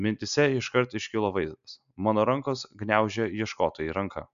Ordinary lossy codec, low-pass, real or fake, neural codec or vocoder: AAC, 96 kbps; 7.2 kHz; real; none